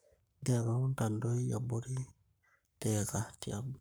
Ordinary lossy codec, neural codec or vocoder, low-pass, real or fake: none; codec, 44.1 kHz, 7.8 kbps, Pupu-Codec; none; fake